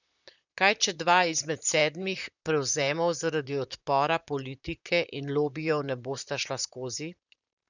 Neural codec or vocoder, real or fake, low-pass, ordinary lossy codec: vocoder, 44.1 kHz, 128 mel bands, Pupu-Vocoder; fake; 7.2 kHz; none